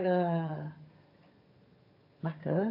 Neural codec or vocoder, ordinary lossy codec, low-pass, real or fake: vocoder, 22.05 kHz, 80 mel bands, HiFi-GAN; AAC, 32 kbps; 5.4 kHz; fake